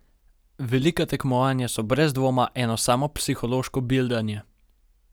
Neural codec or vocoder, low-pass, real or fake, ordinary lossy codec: none; none; real; none